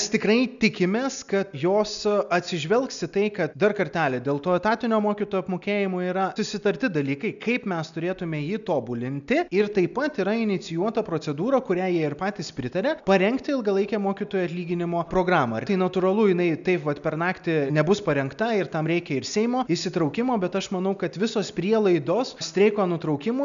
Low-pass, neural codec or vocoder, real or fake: 7.2 kHz; none; real